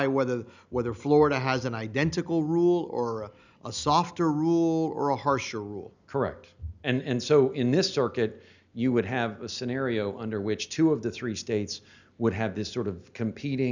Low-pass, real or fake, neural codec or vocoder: 7.2 kHz; real; none